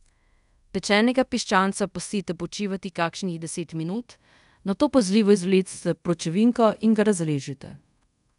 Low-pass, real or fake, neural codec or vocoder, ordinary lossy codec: 10.8 kHz; fake; codec, 24 kHz, 0.5 kbps, DualCodec; none